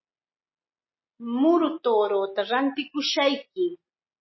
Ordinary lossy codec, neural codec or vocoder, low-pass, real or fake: MP3, 24 kbps; none; 7.2 kHz; real